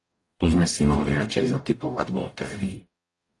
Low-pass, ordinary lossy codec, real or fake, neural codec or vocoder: 10.8 kHz; AAC, 48 kbps; fake; codec, 44.1 kHz, 0.9 kbps, DAC